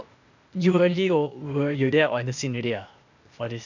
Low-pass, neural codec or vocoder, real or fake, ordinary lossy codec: 7.2 kHz; codec, 16 kHz, 0.8 kbps, ZipCodec; fake; none